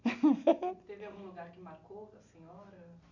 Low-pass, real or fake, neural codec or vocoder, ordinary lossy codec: 7.2 kHz; real; none; none